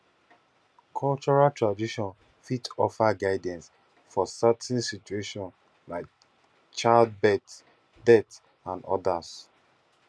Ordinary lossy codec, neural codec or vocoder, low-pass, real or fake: none; none; none; real